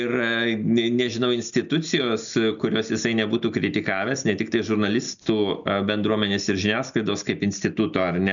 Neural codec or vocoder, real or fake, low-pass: none; real; 7.2 kHz